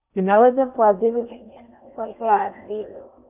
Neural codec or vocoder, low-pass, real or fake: codec, 16 kHz in and 24 kHz out, 0.8 kbps, FocalCodec, streaming, 65536 codes; 3.6 kHz; fake